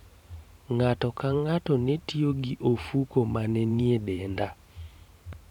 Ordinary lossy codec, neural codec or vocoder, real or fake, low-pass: none; vocoder, 44.1 kHz, 128 mel bands every 512 samples, BigVGAN v2; fake; 19.8 kHz